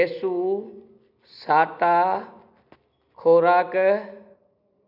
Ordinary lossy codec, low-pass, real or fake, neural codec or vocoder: none; 5.4 kHz; real; none